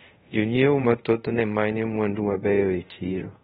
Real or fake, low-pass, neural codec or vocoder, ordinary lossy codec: fake; 10.8 kHz; codec, 24 kHz, 0.5 kbps, DualCodec; AAC, 16 kbps